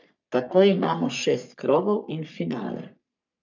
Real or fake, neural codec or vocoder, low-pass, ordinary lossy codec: fake; codec, 44.1 kHz, 3.4 kbps, Pupu-Codec; 7.2 kHz; none